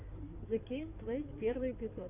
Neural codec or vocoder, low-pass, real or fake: codec, 16 kHz in and 24 kHz out, 2.2 kbps, FireRedTTS-2 codec; 3.6 kHz; fake